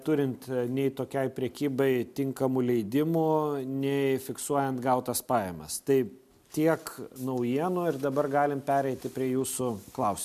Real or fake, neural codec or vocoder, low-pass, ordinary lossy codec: real; none; 14.4 kHz; MP3, 96 kbps